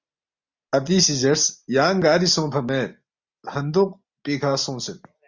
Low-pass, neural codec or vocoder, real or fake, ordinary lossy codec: 7.2 kHz; none; real; Opus, 64 kbps